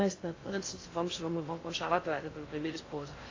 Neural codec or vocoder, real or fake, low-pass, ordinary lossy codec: codec, 16 kHz in and 24 kHz out, 0.6 kbps, FocalCodec, streaming, 2048 codes; fake; 7.2 kHz; AAC, 32 kbps